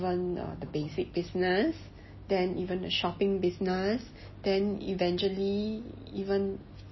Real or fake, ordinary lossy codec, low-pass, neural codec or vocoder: real; MP3, 24 kbps; 7.2 kHz; none